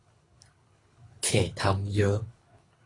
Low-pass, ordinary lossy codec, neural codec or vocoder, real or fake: 10.8 kHz; AAC, 32 kbps; codec, 24 kHz, 3 kbps, HILCodec; fake